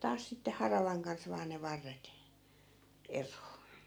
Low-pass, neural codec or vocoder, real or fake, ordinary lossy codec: none; none; real; none